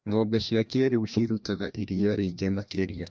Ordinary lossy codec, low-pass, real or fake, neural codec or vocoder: none; none; fake; codec, 16 kHz, 1 kbps, FreqCodec, larger model